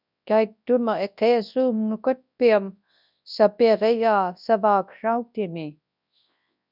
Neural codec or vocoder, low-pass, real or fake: codec, 24 kHz, 0.9 kbps, WavTokenizer, large speech release; 5.4 kHz; fake